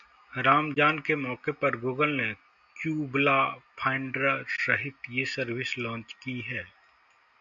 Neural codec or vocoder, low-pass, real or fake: none; 7.2 kHz; real